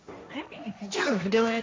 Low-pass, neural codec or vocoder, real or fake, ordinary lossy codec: none; codec, 16 kHz, 1.1 kbps, Voila-Tokenizer; fake; none